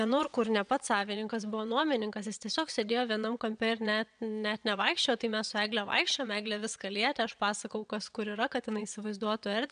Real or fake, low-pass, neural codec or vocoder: fake; 9.9 kHz; vocoder, 22.05 kHz, 80 mel bands, WaveNeXt